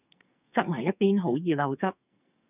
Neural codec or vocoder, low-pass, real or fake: codec, 44.1 kHz, 2.6 kbps, SNAC; 3.6 kHz; fake